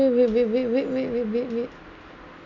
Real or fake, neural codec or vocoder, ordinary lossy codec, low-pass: real; none; none; 7.2 kHz